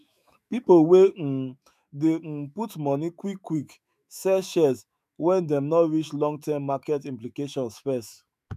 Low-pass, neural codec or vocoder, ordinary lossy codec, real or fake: 14.4 kHz; autoencoder, 48 kHz, 128 numbers a frame, DAC-VAE, trained on Japanese speech; none; fake